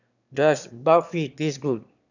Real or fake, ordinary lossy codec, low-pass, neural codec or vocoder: fake; none; 7.2 kHz; autoencoder, 22.05 kHz, a latent of 192 numbers a frame, VITS, trained on one speaker